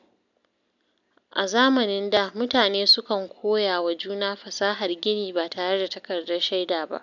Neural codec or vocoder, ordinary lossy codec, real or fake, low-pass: none; none; real; 7.2 kHz